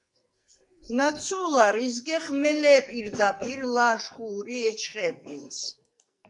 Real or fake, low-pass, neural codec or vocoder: fake; 10.8 kHz; codec, 44.1 kHz, 2.6 kbps, SNAC